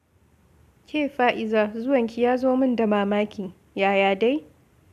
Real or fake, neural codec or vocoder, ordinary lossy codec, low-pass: real; none; AAC, 96 kbps; 14.4 kHz